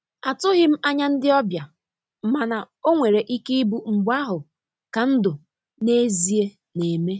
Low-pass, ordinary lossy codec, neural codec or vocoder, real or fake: none; none; none; real